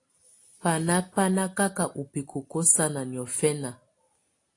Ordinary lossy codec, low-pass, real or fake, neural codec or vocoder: AAC, 48 kbps; 10.8 kHz; real; none